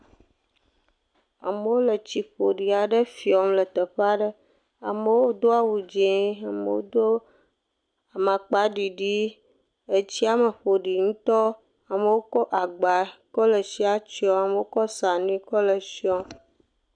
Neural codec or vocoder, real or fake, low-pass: none; real; 9.9 kHz